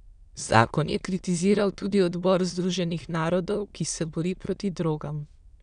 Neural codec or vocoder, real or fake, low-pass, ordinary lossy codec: autoencoder, 22.05 kHz, a latent of 192 numbers a frame, VITS, trained on many speakers; fake; 9.9 kHz; none